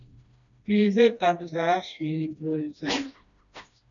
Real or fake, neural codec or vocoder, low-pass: fake; codec, 16 kHz, 1 kbps, FreqCodec, smaller model; 7.2 kHz